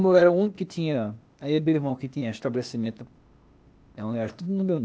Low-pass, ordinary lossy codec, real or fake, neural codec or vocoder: none; none; fake; codec, 16 kHz, 0.8 kbps, ZipCodec